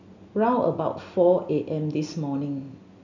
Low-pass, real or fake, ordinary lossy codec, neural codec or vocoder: 7.2 kHz; real; none; none